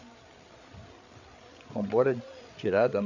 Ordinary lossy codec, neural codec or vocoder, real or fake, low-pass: none; codec, 16 kHz, 8 kbps, FreqCodec, larger model; fake; 7.2 kHz